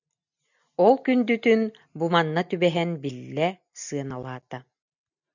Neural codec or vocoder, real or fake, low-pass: none; real; 7.2 kHz